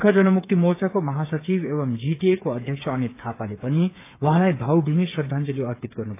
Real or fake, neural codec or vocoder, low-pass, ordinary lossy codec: fake; codec, 16 kHz, 8 kbps, FreqCodec, smaller model; 3.6 kHz; AAC, 24 kbps